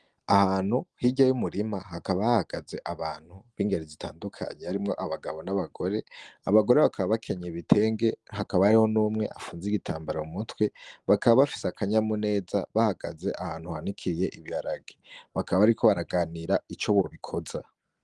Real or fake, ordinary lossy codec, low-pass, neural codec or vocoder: real; Opus, 24 kbps; 10.8 kHz; none